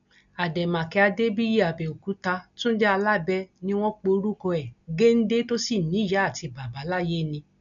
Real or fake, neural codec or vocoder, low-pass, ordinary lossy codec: real; none; 7.2 kHz; none